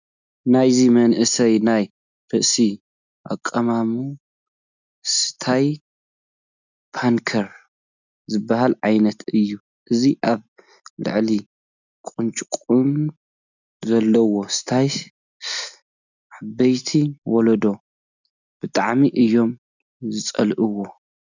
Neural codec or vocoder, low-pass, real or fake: none; 7.2 kHz; real